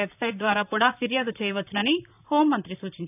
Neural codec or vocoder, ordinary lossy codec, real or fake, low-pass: vocoder, 44.1 kHz, 128 mel bands, Pupu-Vocoder; none; fake; 3.6 kHz